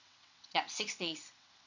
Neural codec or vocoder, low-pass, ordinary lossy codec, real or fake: vocoder, 22.05 kHz, 80 mel bands, WaveNeXt; 7.2 kHz; none; fake